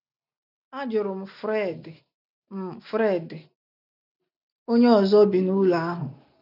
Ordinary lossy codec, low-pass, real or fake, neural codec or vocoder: none; 5.4 kHz; real; none